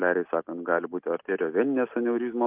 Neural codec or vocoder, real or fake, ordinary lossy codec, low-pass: none; real; Opus, 32 kbps; 3.6 kHz